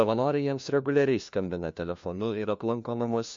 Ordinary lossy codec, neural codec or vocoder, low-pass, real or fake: MP3, 48 kbps; codec, 16 kHz, 1 kbps, FunCodec, trained on LibriTTS, 50 frames a second; 7.2 kHz; fake